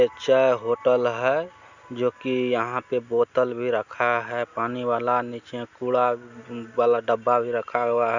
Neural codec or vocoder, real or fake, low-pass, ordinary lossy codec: none; real; 7.2 kHz; Opus, 64 kbps